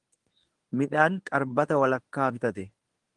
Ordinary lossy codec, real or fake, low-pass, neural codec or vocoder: Opus, 24 kbps; fake; 10.8 kHz; codec, 24 kHz, 0.9 kbps, WavTokenizer, medium speech release version 1